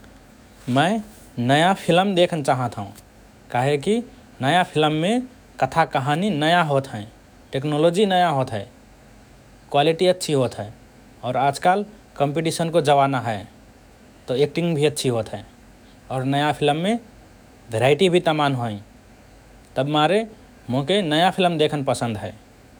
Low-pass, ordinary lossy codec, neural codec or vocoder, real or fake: none; none; autoencoder, 48 kHz, 128 numbers a frame, DAC-VAE, trained on Japanese speech; fake